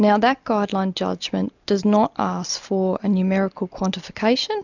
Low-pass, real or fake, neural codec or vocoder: 7.2 kHz; real; none